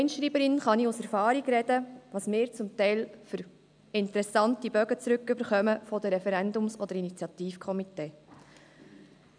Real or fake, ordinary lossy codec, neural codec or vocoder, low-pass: real; none; none; 9.9 kHz